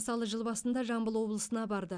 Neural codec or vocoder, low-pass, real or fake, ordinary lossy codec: none; 9.9 kHz; real; none